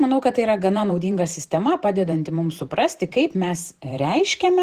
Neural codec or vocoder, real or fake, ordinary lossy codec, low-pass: vocoder, 44.1 kHz, 128 mel bands every 512 samples, BigVGAN v2; fake; Opus, 16 kbps; 14.4 kHz